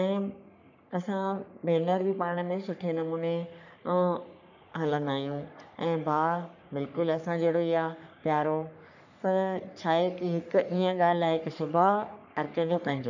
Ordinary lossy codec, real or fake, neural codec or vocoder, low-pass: none; fake; codec, 44.1 kHz, 3.4 kbps, Pupu-Codec; 7.2 kHz